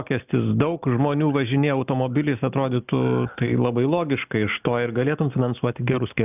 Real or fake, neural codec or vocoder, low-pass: real; none; 3.6 kHz